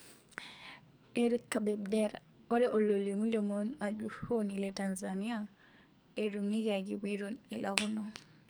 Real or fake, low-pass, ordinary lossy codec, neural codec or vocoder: fake; none; none; codec, 44.1 kHz, 2.6 kbps, SNAC